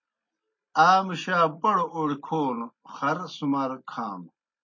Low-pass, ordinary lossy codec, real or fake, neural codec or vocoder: 7.2 kHz; MP3, 32 kbps; real; none